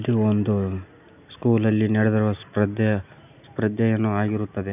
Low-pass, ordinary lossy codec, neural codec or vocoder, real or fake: 3.6 kHz; none; none; real